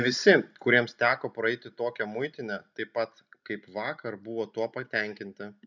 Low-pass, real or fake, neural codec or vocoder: 7.2 kHz; real; none